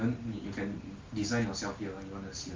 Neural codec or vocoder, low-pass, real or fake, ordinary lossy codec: none; 7.2 kHz; real; Opus, 32 kbps